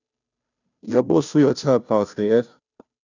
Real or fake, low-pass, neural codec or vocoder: fake; 7.2 kHz; codec, 16 kHz, 0.5 kbps, FunCodec, trained on Chinese and English, 25 frames a second